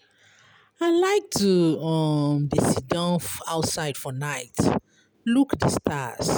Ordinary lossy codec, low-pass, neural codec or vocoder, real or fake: none; none; none; real